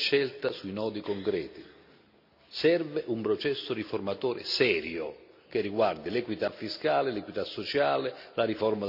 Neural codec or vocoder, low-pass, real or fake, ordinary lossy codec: none; 5.4 kHz; real; none